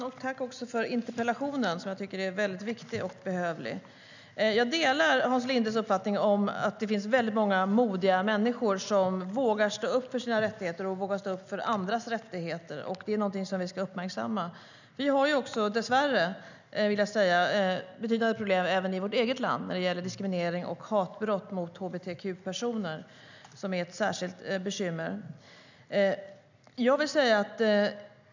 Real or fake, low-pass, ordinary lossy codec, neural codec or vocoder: real; 7.2 kHz; none; none